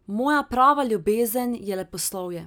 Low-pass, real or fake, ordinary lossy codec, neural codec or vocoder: none; real; none; none